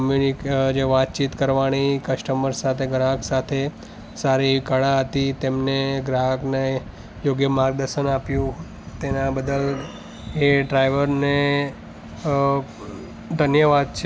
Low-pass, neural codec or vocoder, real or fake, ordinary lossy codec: none; none; real; none